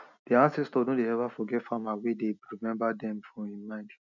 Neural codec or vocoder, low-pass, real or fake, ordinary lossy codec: none; 7.2 kHz; real; none